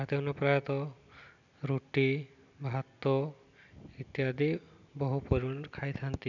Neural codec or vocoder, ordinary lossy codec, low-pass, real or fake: none; none; 7.2 kHz; real